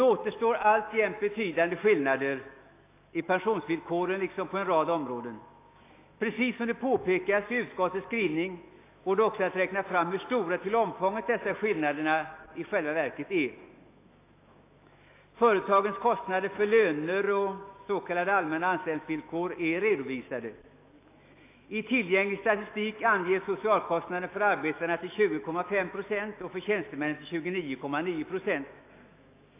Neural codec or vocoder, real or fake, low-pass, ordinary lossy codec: autoencoder, 48 kHz, 128 numbers a frame, DAC-VAE, trained on Japanese speech; fake; 3.6 kHz; AAC, 24 kbps